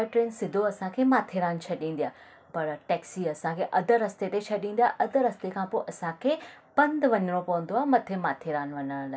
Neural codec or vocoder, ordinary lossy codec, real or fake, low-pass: none; none; real; none